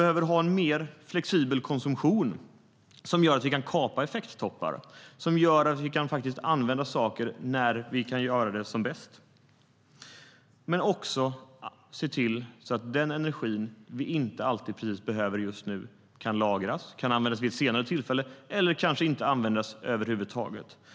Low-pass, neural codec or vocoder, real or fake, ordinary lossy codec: none; none; real; none